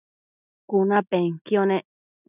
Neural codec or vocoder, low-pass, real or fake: none; 3.6 kHz; real